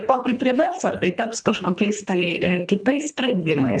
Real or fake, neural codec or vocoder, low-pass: fake; codec, 24 kHz, 1.5 kbps, HILCodec; 9.9 kHz